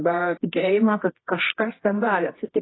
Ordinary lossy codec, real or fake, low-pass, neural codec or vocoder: AAC, 16 kbps; fake; 7.2 kHz; codec, 24 kHz, 0.9 kbps, WavTokenizer, medium music audio release